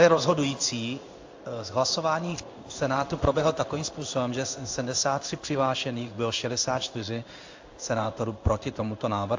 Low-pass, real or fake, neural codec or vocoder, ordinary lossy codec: 7.2 kHz; fake; codec, 16 kHz in and 24 kHz out, 1 kbps, XY-Tokenizer; AAC, 48 kbps